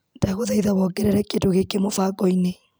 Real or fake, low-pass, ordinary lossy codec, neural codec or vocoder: real; none; none; none